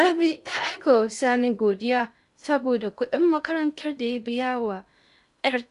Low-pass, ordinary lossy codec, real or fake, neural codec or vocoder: 10.8 kHz; AAC, 96 kbps; fake; codec, 16 kHz in and 24 kHz out, 0.6 kbps, FocalCodec, streaming, 2048 codes